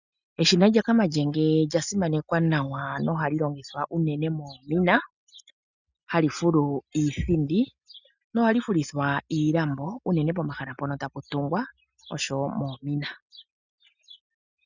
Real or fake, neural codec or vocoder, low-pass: real; none; 7.2 kHz